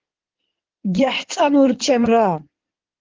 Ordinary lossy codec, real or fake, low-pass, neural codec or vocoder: Opus, 16 kbps; fake; 7.2 kHz; codec, 16 kHz in and 24 kHz out, 2.2 kbps, FireRedTTS-2 codec